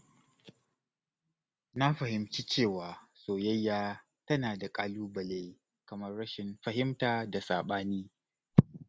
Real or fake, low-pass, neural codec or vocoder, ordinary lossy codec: real; none; none; none